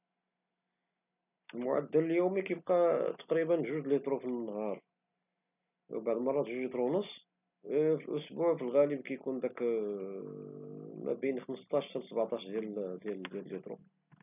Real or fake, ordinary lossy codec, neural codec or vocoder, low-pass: real; none; none; 3.6 kHz